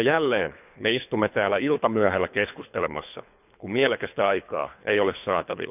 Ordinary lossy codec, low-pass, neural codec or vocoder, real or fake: none; 3.6 kHz; codec, 24 kHz, 3 kbps, HILCodec; fake